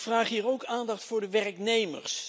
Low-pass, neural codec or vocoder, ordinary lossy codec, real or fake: none; none; none; real